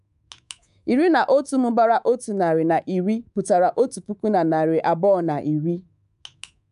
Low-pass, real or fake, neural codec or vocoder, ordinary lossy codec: 10.8 kHz; fake; codec, 24 kHz, 3.1 kbps, DualCodec; none